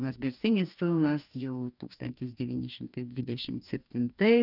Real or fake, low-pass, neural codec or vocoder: fake; 5.4 kHz; codec, 44.1 kHz, 2.6 kbps, DAC